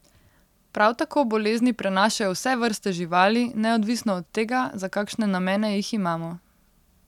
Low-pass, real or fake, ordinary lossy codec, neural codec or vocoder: 19.8 kHz; real; none; none